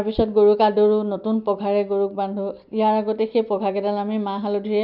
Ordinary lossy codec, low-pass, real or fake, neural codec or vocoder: none; 5.4 kHz; real; none